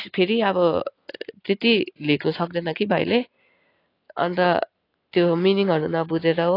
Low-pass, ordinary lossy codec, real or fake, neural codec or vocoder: 5.4 kHz; AAC, 32 kbps; real; none